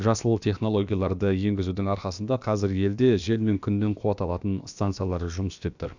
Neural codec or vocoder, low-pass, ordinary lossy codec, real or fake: codec, 16 kHz, about 1 kbps, DyCAST, with the encoder's durations; 7.2 kHz; none; fake